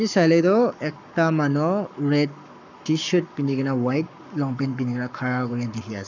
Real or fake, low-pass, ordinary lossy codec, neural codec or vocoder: fake; 7.2 kHz; none; codec, 44.1 kHz, 7.8 kbps, Pupu-Codec